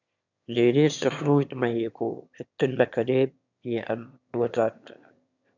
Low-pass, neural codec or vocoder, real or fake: 7.2 kHz; autoencoder, 22.05 kHz, a latent of 192 numbers a frame, VITS, trained on one speaker; fake